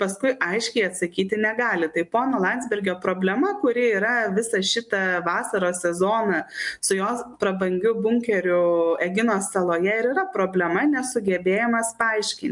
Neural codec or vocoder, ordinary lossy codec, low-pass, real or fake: none; MP3, 64 kbps; 10.8 kHz; real